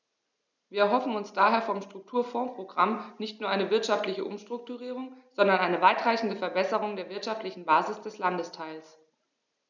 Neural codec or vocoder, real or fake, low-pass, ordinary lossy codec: none; real; 7.2 kHz; none